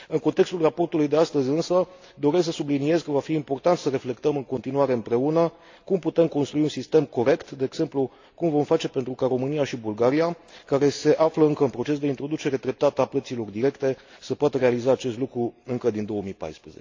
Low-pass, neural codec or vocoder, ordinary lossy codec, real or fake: 7.2 kHz; none; AAC, 48 kbps; real